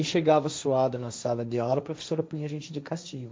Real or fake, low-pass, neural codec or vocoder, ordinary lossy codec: fake; none; codec, 16 kHz, 1.1 kbps, Voila-Tokenizer; none